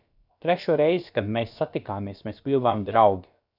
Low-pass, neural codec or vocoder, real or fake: 5.4 kHz; codec, 16 kHz, about 1 kbps, DyCAST, with the encoder's durations; fake